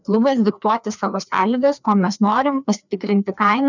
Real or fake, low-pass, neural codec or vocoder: fake; 7.2 kHz; codec, 16 kHz, 2 kbps, FreqCodec, larger model